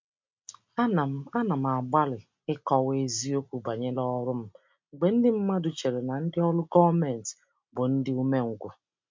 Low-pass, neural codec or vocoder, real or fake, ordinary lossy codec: 7.2 kHz; none; real; MP3, 48 kbps